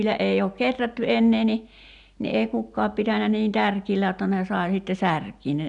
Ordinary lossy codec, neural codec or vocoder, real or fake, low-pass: none; none; real; 10.8 kHz